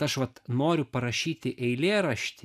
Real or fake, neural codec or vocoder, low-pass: real; none; 14.4 kHz